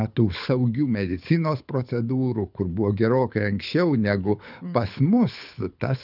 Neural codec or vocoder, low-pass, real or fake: codec, 24 kHz, 6 kbps, HILCodec; 5.4 kHz; fake